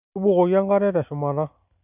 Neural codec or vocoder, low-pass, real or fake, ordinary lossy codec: none; 3.6 kHz; real; none